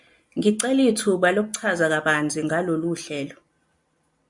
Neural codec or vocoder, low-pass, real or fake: none; 10.8 kHz; real